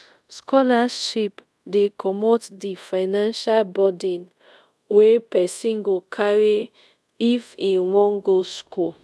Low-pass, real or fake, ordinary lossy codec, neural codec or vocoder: none; fake; none; codec, 24 kHz, 0.5 kbps, DualCodec